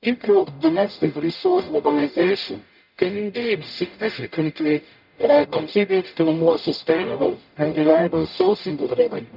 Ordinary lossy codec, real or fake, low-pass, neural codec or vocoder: none; fake; 5.4 kHz; codec, 44.1 kHz, 0.9 kbps, DAC